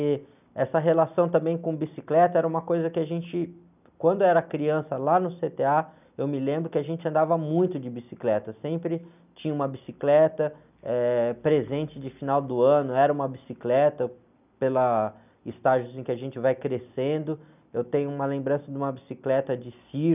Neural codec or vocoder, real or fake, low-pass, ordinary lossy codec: none; real; 3.6 kHz; none